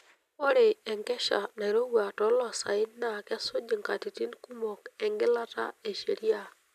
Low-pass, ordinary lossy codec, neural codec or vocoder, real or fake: 14.4 kHz; none; none; real